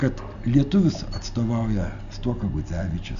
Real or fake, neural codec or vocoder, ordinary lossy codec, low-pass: real; none; MP3, 96 kbps; 7.2 kHz